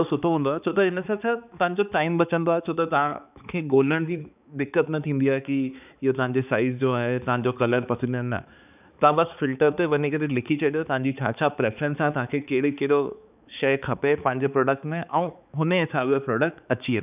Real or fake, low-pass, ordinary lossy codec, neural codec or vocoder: fake; 3.6 kHz; none; codec, 16 kHz, 4 kbps, X-Codec, HuBERT features, trained on balanced general audio